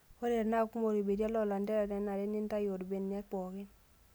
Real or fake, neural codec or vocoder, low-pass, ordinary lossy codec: real; none; none; none